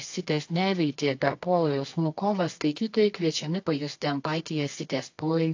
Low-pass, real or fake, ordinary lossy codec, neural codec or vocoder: 7.2 kHz; fake; AAC, 48 kbps; codec, 24 kHz, 0.9 kbps, WavTokenizer, medium music audio release